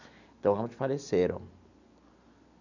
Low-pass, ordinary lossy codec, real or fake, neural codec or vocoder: 7.2 kHz; none; real; none